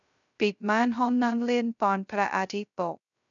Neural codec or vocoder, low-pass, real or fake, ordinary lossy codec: codec, 16 kHz, 0.2 kbps, FocalCodec; 7.2 kHz; fake; none